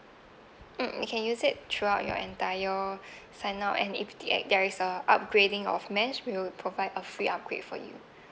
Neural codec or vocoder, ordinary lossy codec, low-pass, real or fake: none; none; none; real